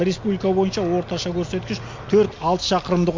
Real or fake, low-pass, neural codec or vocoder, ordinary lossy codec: real; 7.2 kHz; none; MP3, 48 kbps